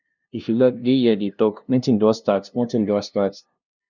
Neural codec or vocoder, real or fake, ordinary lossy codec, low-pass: codec, 16 kHz, 0.5 kbps, FunCodec, trained on LibriTTS, 25 frames a second; fake; none; 7.2 kHz